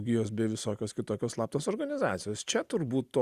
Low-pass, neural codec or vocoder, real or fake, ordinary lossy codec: 14.4 kHz; none; real; Opus, 64 kbps